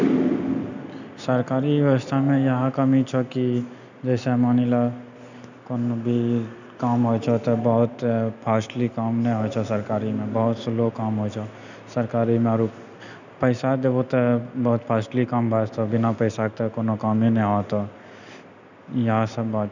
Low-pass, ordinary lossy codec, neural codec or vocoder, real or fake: 7.2 kHz; none; none; real